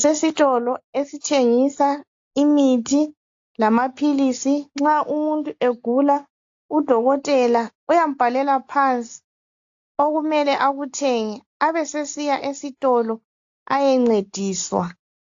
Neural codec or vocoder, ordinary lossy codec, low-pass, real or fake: none; AAC, 48 kbps; 7.2 kHz; real